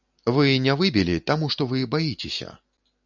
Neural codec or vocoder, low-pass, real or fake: none; 7.2 kHz; real